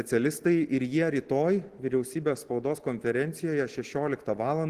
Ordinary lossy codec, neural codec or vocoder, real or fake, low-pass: Opus, 16 kbps; none; real; 14.4 kHz